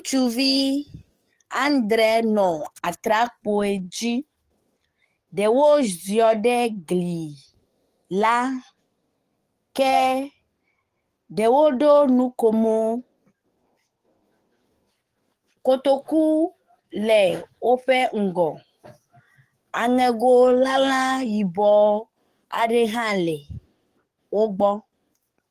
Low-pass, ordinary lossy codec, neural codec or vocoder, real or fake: 14.4 kHz; Opus, 24 kbps; codec, 44.1 kHz, 7.8 kbps, DAC; fake